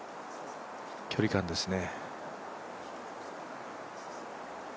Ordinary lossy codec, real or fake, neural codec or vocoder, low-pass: none; real; none; none